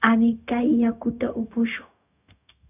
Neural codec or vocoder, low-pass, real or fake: codec, 16 kHz, 0.4 kbps, LongCat-Audio-Codec; 3.6 kHz; fake